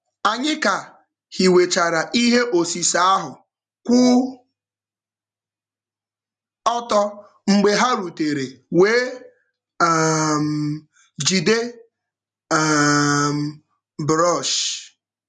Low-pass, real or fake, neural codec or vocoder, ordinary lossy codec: 10.8 kHz; real; none; none